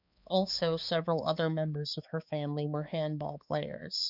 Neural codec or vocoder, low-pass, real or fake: codec, 16 kHz, 4 kbps, X-Codec, HuBERT features, trained on balanced general audio; 5.4 kHz; fake